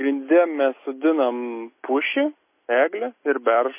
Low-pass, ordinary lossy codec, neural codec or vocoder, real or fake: 3.6 kHz; MP3, 24 kbps; none; real